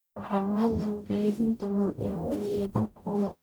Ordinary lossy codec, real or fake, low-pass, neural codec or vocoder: none; fake; none; codec, 44.1 kHz, 0.9 kbps, DAC